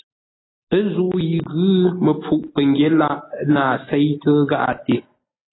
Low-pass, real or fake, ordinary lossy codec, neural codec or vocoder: 7.2 kHz; real; AAC, 16 kbps; none